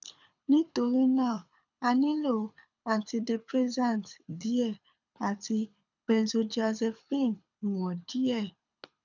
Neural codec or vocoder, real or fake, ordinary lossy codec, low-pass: codec, 24 kHz, 6 kbps, HILCodec; fake; none; 7.2 kHz